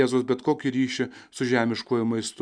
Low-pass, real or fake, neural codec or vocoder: 9.9 kHz; real; none